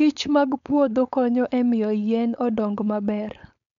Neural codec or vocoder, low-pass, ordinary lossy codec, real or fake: codec, 16 kHz, 4.8 kbps, FACodec; 7.2 kHz; none; fake